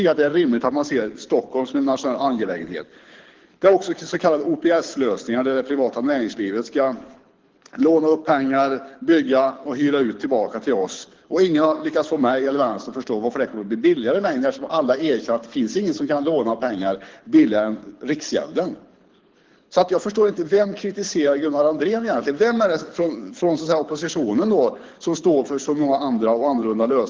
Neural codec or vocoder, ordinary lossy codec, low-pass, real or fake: codec, 24 kHz, 6 kbps, HILCodec; Opus, 16 kbps; 7.2 kHz; fake